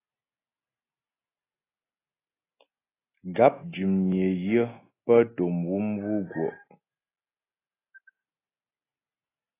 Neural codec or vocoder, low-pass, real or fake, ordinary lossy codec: none; 3.6 kHz; real; AAC, 16 kbps